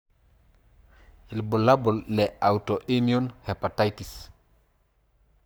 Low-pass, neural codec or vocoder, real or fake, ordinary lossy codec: none; codec, 44.1 kHz, 7.8 kbps, Pupu-Codec; fake; none